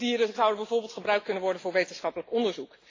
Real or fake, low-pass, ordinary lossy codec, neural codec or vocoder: real; 7.2 kHz; AAC, 32 kbps; none